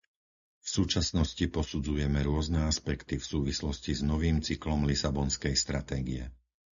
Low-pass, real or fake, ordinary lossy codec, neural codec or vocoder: 7.2 kHz; real; MP3, 64 kbps; none